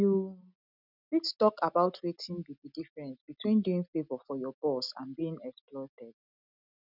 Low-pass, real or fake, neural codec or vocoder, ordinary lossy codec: 5.4 kHz; real; none; none